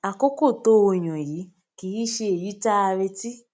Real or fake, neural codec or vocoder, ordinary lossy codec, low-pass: real; none; none; none